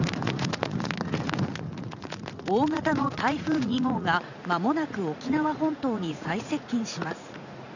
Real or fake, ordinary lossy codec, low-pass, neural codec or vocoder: fake; none; 7.2 kHz; vocoder, 44.1 kHz, 80 mel bands, Vocos